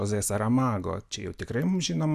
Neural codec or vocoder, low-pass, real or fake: none; 14.4 kHz; real